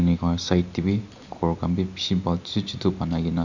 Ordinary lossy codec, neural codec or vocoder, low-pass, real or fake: none; none; 7.2 kHz; real